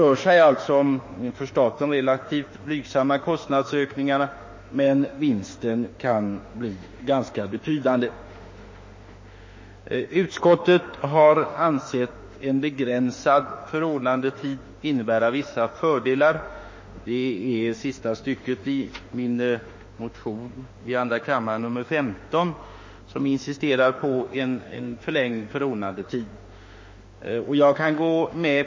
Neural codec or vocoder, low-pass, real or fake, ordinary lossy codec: autoencoder, 48 kHz, 32 numbers a frame, DAC-VAE, trained on Japanese speech; 7.2 kHz; fake; MP3, 32 kbps